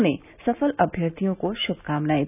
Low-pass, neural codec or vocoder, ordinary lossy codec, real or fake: 3.6 kHz; none; none; real